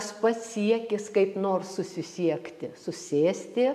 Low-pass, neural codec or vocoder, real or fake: 14.4 kHz; none; real